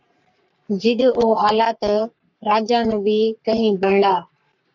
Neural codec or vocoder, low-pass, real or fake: codec, 44.1 kHz, 3.4 kbps, Pupu-Codec; 7.2 kHz; fake